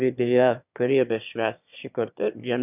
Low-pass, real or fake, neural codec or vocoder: 3.6 kHz; fake; autoencoder, 22.05 kHz, a latent of 192 numbers a frame, VITS, trained on one speaker